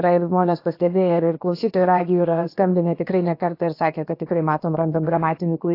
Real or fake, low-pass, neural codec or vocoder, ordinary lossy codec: fake; 5.4 kHz; codec, 16 kHz, about 1 kbps, DyCAST, with the encoder's durations; AAC, 32 kbps